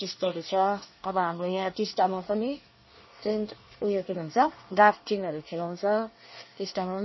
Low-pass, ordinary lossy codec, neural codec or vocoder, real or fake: 7.2 kHz; MP3, 24 kbps; codec, 24 kHz, 1 kbps, SNAC; fake